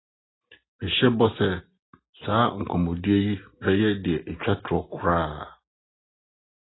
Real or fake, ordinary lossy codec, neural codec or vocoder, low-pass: real; AAC, 16 kbps; none; 7.2 kHz